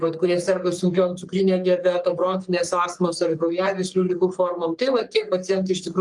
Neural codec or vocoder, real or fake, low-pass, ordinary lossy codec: codec, 44.1 kHz, 3.4 kbps, Pupu-Codec; fake; 10.8 kHz; Opus, 32 kbps